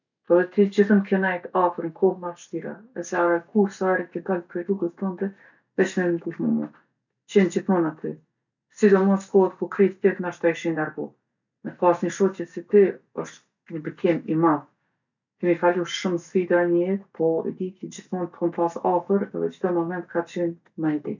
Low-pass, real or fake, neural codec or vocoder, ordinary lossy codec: 7.2 kHz; real; none; none